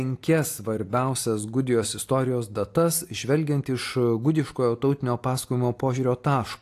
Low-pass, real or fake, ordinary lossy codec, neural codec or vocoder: 14.4 kHz; real; AAC, 64 kbps; none